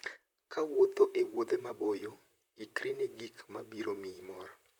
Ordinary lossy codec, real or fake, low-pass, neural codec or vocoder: none; fake; 19.8 kHz; vocoder, 44.1 kHz, 128 mel bands, Pupu-Vocoder